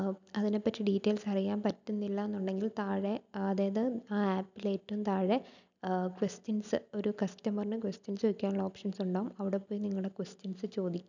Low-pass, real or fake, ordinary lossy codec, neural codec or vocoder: 7.2 kHz; real; none; none